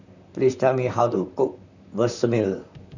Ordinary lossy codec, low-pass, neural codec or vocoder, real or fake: none; 7.2 kHz; vocoder, 44.1 kHz, 128 mel bands, Pupu-Vocoder; fake